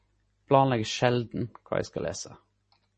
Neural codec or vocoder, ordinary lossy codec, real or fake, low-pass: none; MP3, 32 kbps; real; 10.8 kHz